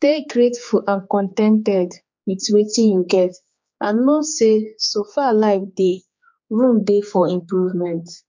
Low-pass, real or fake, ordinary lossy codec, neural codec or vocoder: 7.2 kHz; fake; MP3, 48 kbps; codec, 16 kHz, 4 kbps, X-Codec, HuBERT features, trained on general audio